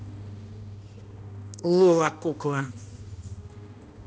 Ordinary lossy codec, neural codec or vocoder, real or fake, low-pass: none; codec, 16 kHz, 1 kbps, X-Codec, HuBERT features, trained on balanced general audio; fake; none